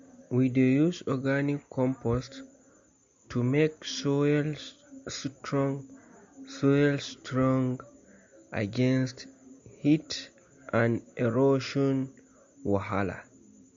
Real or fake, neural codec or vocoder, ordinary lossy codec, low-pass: real; none; MP3, 48 kbps; 7.2 kHz